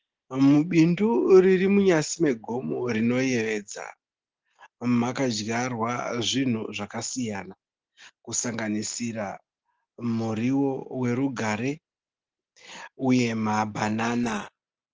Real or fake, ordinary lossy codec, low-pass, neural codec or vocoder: real; Opus, 16 kbps; 7.2 kHz; none